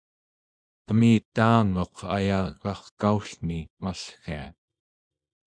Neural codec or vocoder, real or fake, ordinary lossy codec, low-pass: codec, 24 kHz, 0.9 kbps, WavTokenizer, small release; fake; MP3, 96 kbps; 9.9 kHz